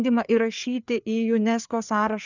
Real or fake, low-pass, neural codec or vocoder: fake; 7.2 kHz; codec, 16 kHz, 4 kbps, FreqCodec, larger model